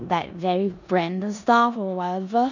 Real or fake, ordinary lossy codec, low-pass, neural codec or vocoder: fake; none; 7.2 kHz; codec, 16 kHz in and 24 kHz out, 0.9 kbps, LongCat-Audio-Codec, four codebook decoder